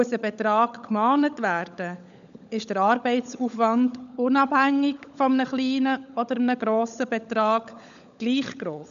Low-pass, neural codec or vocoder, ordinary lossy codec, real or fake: 7.2 kHz; codec, 16 kHz, 16 kbps, FunCodec, trained on LibriTTS, 50 frames a second; none; fake